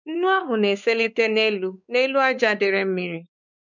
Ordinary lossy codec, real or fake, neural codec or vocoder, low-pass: none; fake; codec, 16 kHz, 4 kbps, X-Codec, WavLM features, trained on Multilingual LibriSpeech; 7.2 kHz